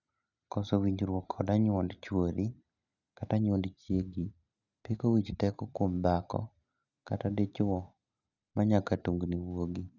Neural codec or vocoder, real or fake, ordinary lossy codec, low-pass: none; real; none; 7.2 kHz